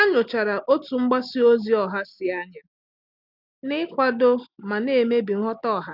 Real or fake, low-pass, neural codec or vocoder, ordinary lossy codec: real; 5.4 kHz; none; none